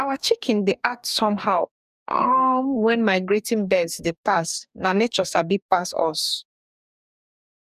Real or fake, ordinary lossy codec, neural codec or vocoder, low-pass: fake; none; codec, 44.1 kHz, 2.6 kbps, DAC; 14.4 kHz